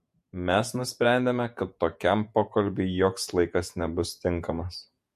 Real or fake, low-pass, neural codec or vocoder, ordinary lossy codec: fake; 14.4 kHz; vocoder, 48 kHz, 128 mel bands, Vocos; MP3, 64 kbps